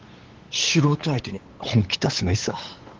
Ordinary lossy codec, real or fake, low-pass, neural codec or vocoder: Opus, 16 kbps; fake; 7.2 kHz; codec, 16 kHz, 8 kbps, FunCodec, trained on LibriTTS, 25 frames a second